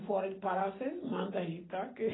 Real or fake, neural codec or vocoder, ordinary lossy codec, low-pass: real; none; AAC, 16 kbps; 7.2 kHz